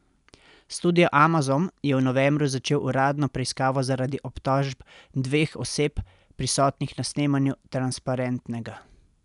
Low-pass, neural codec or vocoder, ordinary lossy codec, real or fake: 10.8 kHz; none; none; real